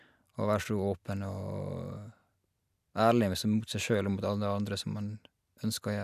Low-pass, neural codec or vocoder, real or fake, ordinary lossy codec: 14.4 kHz; none; real; none